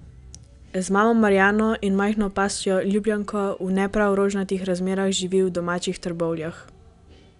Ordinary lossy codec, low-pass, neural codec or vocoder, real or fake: none; 10.8 kHz; none; real